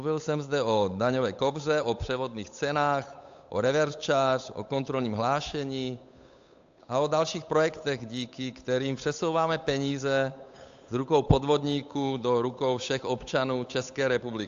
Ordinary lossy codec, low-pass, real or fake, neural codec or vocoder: AAC, 64 kbps; 7.2 kHz; fake; codec, 16 kHz, 8 kbps, FunCodec, trained on Chinese and English, 25 frames a second